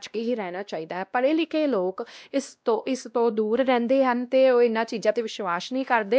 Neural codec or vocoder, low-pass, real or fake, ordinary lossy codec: codec, 16 kHz, 1 kbps, X-Codec, WavLM features, trained on Multilingual LibriSpeech; none; fake; none